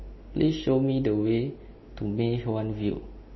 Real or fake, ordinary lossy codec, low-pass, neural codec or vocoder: real; MP3, 24 kbps; 7.2 kHz; none